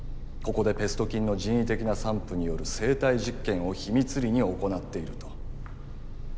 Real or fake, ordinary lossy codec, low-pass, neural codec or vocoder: real; none; none; none